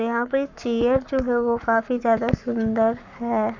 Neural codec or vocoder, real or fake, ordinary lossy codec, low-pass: codec, 44.1 kHz, 7.8 kbps, Pupu-Codec; fake; none; 7.2 kHz